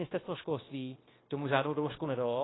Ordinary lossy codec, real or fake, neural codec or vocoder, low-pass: AAC, 16 kbps; fake; codec, 16 kHz, 0.9 kbps, LongCat-Audio-Codec; 7.2 kHz